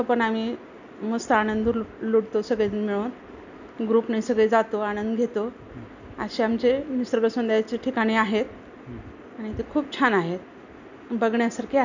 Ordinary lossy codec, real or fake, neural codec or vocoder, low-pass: none; real; none; 7.2 kHz